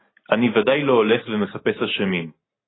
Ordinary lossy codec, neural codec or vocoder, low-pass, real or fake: AAC, 16 kbps; none; 7.2 kHz; real